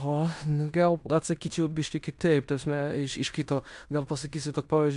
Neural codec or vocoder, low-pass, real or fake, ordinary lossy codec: codec, 16 kHz in and 24 kHz out, 0.9 kbps, LongCat-Audio-Codec, fine tuned four codebook decoder; 10.8 kHz; fake; AAC, 96 kbps